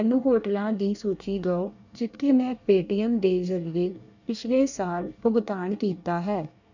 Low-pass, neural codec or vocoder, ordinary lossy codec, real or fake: 7.2 kHz; codec, 24 kHz, 1 kbps, SNAC; none; fake